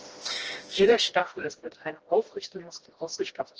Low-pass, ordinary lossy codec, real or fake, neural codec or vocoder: 7.2 kHz; Opus, 16 kbps; fake; codec, 16 kHz, 1 kbps, FreqCodec, smaller model